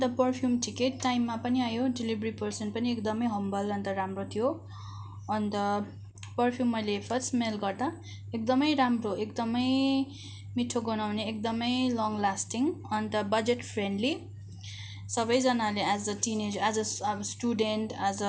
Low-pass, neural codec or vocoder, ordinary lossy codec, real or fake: none; none; none; real